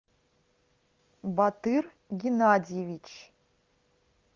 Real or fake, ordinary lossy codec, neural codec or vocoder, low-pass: real; Opus, 32 kbps; none; 7.2 kHz